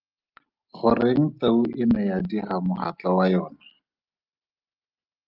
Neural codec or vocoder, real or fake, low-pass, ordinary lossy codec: none; real; 5.4 kHz; Opus, 32 kbps